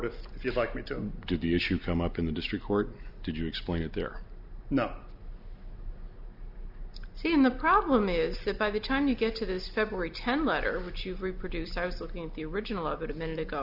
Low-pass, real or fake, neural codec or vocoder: 5.4 kHz; real; none